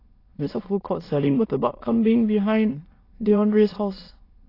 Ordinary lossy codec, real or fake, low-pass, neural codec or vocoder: AAC, 24 kbps; fake; 5.4 kHz; autoencoder, 22.05 kHz, a latent of 192 numbers a frame, VITS, trained on many speakers